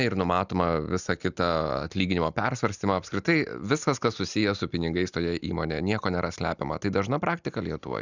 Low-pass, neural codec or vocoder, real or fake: 7.2 kHz; none; real